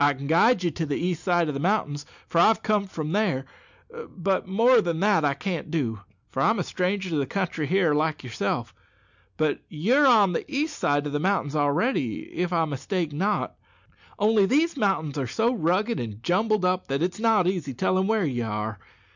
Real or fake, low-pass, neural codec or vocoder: real; 7.2 kHz; none